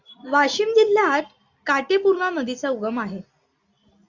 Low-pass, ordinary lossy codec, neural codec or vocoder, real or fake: 7.2 kHz; Opus, 64 kbps; none; real